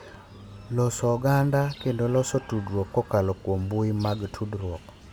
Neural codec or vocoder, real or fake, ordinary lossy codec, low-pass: none; real; none; 19.8 kHz